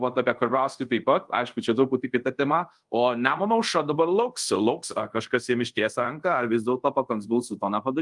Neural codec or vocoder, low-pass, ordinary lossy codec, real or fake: codec, 24 kHz, 0.5 kbps, DualCodec; 10.8 kHz; Opus, 32 kbps; fake